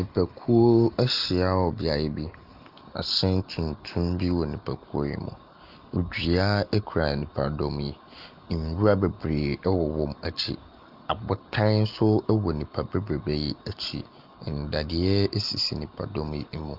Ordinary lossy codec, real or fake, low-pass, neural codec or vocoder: Opus, 32 kbps; real; 5.4 kHz; none